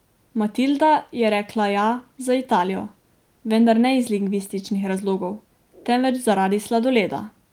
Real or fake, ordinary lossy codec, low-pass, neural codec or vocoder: real; Opus, 24 kbps; 19.8 kHz; none